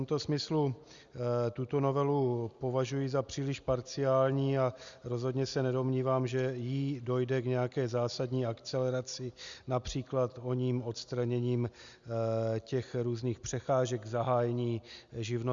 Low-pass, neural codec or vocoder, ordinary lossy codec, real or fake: 7.2 kHz; none; Opus, 64 kbps; real